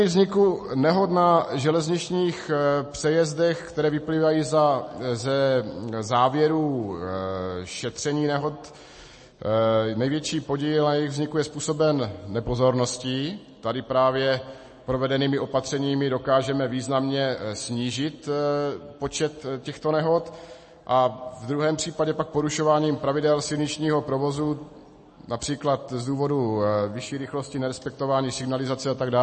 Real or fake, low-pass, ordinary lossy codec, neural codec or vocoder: real; 10.8 kHz; MP3, 32 kbps; none